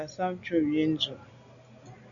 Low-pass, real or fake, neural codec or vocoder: 7.2 kHz; real; none